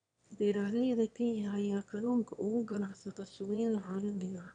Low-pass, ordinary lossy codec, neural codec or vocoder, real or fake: 9.9 kHz; none; autoencoder, 22.05 kHz, a latent of 192 numbers a frame, VITS, trained on one speaker; fake